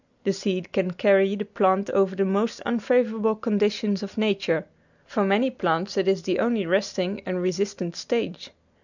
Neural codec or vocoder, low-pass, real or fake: none; 7.2 kHz; real